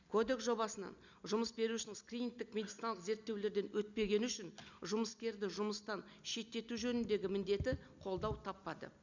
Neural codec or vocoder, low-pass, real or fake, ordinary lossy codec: none; 7.2 kHz; real; none